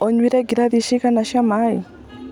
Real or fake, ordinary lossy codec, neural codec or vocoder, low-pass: real; none; none; 19.8 kHz